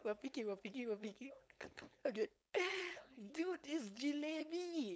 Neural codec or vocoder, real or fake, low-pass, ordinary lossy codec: codec, 16 kHz, 4.8 kbps, FACodec; fake; none; none